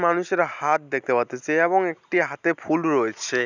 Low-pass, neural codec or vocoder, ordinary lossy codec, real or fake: 7.2 kHz; none; none; real